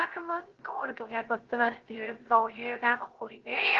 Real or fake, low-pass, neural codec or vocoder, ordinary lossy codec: fake; 7.2 kHz; codec, 16 kHz, 0.3 kbps, FocalCodec; Opus, 16 kbps